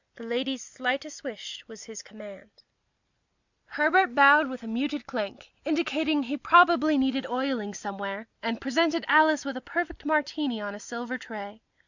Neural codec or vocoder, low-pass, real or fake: none; 7.2 kHz; real